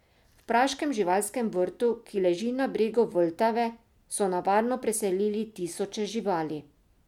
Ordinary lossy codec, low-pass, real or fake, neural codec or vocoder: MP3, 96 kbps; 19.8 kHz; real; none